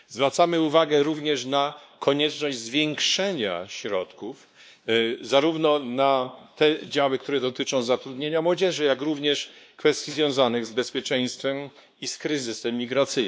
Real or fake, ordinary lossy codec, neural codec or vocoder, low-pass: fake; none; codec, 16 kHz, 2 kbps, X-Codec, WavLM features, trained on Multilingual LibriSpeech; none